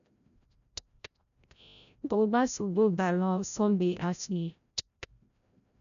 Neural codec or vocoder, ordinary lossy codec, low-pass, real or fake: codec, 16 kHz, 0.5 kbps, FreqCodec, larger model; none; 7.2 kHz; fake